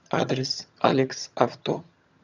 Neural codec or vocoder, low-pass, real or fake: vocoder, 22.05 kHz, 80 mel bands, HiFi-GAN; 7.2 kHz; fake